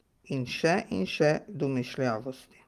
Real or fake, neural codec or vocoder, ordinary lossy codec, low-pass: real; none; Opus, 24 kbps; 14.4 kHz